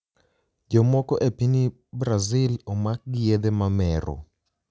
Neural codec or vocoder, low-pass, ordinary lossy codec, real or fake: none; none; none; real